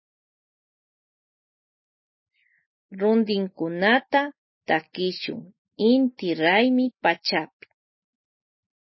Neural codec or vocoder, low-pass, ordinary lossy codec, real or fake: none; 7.2 kHz; MP3, 24 kbps; real